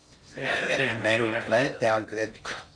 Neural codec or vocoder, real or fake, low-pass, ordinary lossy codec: codec, 16 kHz in and 24 kHz out, 0.6 kbps, FocalCodec, streaming, 4096 codes; fake; 9.9 kHz; MP3, 64 kbps